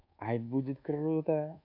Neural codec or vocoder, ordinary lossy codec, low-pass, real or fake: codec, 24 kHz, 1.2 kbps, DualCodec; AAC, 32 kbps; 5.4 kHz; fake